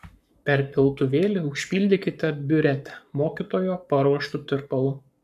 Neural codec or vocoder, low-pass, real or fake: codec, 44.1 kHz, 7.8 kbps, Pupu-Codec; 14.4 kHz; fake